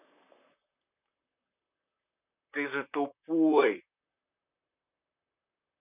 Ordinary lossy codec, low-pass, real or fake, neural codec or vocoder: none; 3.6 kHz; fake; vocoder, 44.1 kHz, 128 mel bands, Pupu-Vocoder